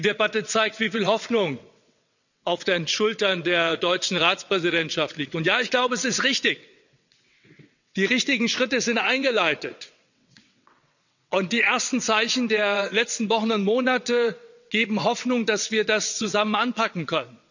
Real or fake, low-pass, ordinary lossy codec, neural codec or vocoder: fake; 7.2 kHz; none; vocoder, 22.05 kHz, 80 mel bands, WaveNeXt